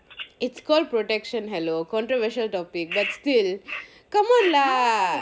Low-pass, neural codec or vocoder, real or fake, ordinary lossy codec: none; none; real; none